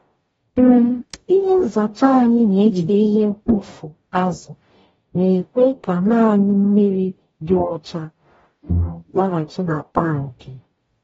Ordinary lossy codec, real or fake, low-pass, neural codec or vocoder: AAC, 24 kbps; fake; 19.8 kHz; codec, 44.1 kHz, 0.9 kbps, DAC